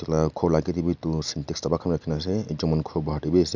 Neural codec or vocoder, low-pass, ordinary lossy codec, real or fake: none; 7.2 kHz; none; real